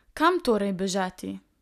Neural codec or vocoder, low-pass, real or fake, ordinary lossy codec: none; 14.4 kHz; real; none